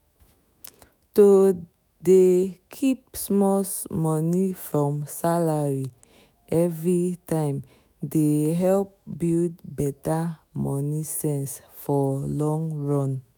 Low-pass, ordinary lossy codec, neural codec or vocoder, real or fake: none; none; autoencoder, 48 kHz, 128 numbers a frame, DAC-VAE, trained on Japanese speech; fake